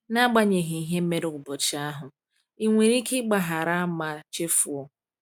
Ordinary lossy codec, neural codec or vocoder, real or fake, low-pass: none; none; real; 19.8 kHz